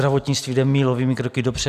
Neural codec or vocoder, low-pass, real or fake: none; 14.4 kHz; real